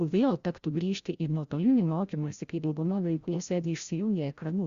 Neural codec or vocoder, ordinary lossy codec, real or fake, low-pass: codec, 16 kHz, 0.5 kbps, FreqCodec, larger model; Opus, 64 kbps; fake; 7.2 kHz